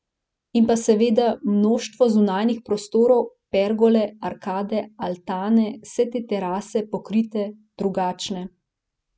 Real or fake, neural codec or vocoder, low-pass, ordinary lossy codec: real; none; none; none